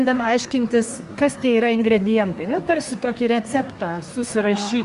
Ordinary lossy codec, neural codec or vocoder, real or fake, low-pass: Opus, 64 kbps; codec, 24 kHz, 1 kbps, SNAC; fake; 10.8 kHz